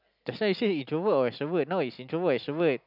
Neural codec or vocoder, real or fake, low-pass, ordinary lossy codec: none; real; 5.4 kHz; none